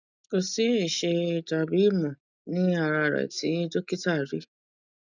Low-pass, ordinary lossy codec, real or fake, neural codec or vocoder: 7.2 kHz; none; real; none